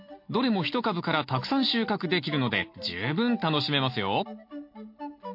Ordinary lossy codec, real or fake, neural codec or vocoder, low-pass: MP3, 32 kbps; real; none; 5.4 kHz